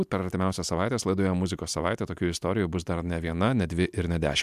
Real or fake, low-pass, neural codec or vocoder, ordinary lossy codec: real; 14.4 kHz; none; MP3, 96 kbps